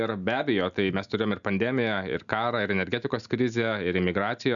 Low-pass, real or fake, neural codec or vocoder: 7.2 kHz; real; none